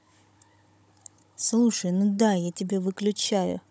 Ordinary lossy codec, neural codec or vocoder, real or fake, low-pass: none; codec, 16 kHz, 16 kbps, FunCodec, trained on Chinese and English, 50 frames a second; fake; none